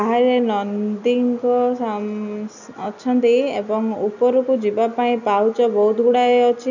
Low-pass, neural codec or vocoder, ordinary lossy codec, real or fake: 7.2 kHz; none; none; real